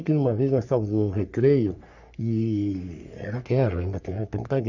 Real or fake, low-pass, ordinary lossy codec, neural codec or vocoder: fake; 7.2 kHz; none; codec, 44.1 kHz, 3.4 kbps, Pupu-Codec